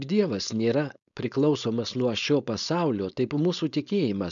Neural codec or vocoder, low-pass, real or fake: codec, 16 kHz, 4.8 kbps, FACodec; 7.2 kHz; fake